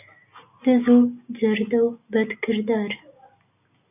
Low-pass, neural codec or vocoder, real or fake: 3.6 kHz; none; real